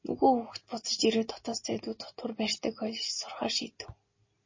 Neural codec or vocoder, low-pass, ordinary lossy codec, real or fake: none; 7.2 kHz; MP3, 32 kbps; real